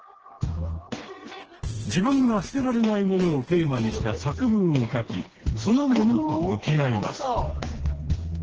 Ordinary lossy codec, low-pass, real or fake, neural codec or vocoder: Opus, 16 kbps; 7.2 kHz; fake; codec, 16 kHz, 2 kbps, FreqCodec, smaller model